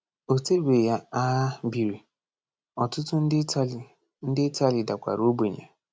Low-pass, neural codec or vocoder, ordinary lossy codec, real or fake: none; none; none; real